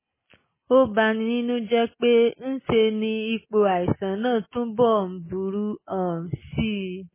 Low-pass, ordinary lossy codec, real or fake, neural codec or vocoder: 3.6 kHz; MP3, 16 kbps; real; none